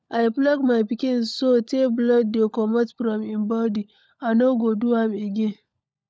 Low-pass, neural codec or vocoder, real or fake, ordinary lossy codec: none; codec, 16 kHz, 16 kbps, FunCodec, trained on LibriTTS, 50 frames a second; fake; none